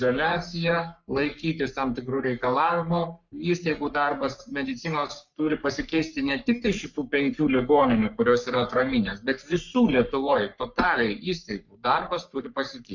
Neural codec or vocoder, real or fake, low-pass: codec, 44.1 kHz, 3.4 kbps, Pupu-Codec; fake; 7.2 kHz